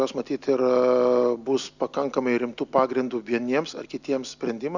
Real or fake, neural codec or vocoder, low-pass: real; none; 7.2 kHz